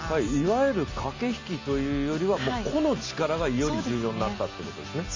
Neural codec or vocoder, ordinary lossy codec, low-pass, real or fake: none; MP3, 64 kbps; 7.2 kHz; real